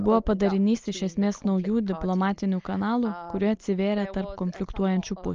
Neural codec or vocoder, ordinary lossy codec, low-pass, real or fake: none; Opus, 24 kbps; 7.2 kHz; real